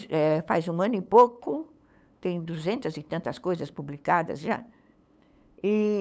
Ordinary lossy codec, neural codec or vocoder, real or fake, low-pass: none; codec, 16 kHz, 8 kbps, FunCodec, trained on LibriTTS, 25 frames a second; fake; none